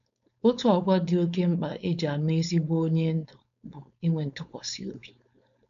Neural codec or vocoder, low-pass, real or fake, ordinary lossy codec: codec, 16 kHz, 4.8 kbps, FACodec; 7.2 kHz; fake; none